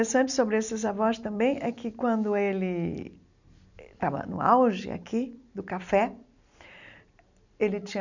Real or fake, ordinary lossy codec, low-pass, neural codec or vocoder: real; none; 7.2 kHz; none